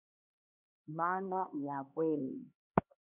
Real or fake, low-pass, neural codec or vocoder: fake; 3.6 kHz; codec, 16 kHz, 2 kbps, X-Codec, HuBERT features, trained on LibriSpeech